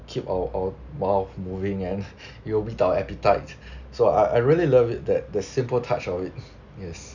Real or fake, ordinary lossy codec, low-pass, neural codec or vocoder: real; none; 7.2 kHz; none